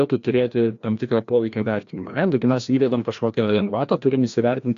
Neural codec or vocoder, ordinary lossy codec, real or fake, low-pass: codec, 16 kHz, 1 kbps, FreqCodec, larger model; AAC, 64 kbps; fake; 7.2 kHz